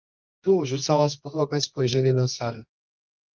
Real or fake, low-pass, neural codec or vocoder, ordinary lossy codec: fake; 7.2 kHz; codec, 24 kHz, 0.9 kbps, WavTokenizer, medium music audio release; Opus, 24 kbps